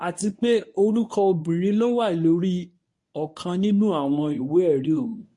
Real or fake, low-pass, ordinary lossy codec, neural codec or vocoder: fake; 10.8 kHz; MP3, 48 kbps; codec, 24 kHz, 0.9 kbps, WavTokenizer, medium speech release version 1